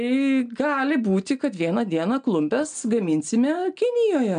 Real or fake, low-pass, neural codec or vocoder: real; 9.9 kHz; none